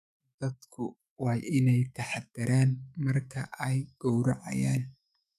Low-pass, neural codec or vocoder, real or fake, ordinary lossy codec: 14.4 kHz; autoencoder, 48 kHz, 128 numbers a frame, DAC-VAE, trained on Japanese speech; fake; none